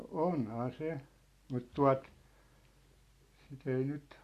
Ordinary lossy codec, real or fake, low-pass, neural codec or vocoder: Opus, 64 kbps; real; 14.4 kHz; none